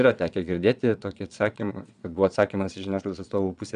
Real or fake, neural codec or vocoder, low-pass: fake; vocoder, 22.05 kHz, 80 mel bands, Vocos; 9.9 kHz